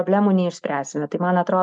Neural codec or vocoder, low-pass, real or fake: none; 9.9 kHz; real